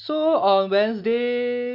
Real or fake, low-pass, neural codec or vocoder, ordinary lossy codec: real; 5.4 kHz; none; AAC, 48 kbps